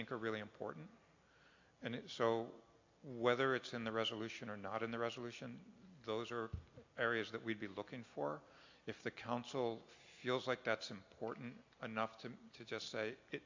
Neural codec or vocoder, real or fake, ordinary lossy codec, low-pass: none; real; AAC, 48 kbps; 7.2 kHz